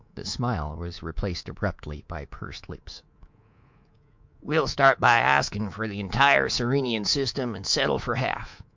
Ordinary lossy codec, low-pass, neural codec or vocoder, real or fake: MP3, 64 kbps; 7.2 kHz; codec, 24 kHz, 3.1 kbps, DualCodec; fake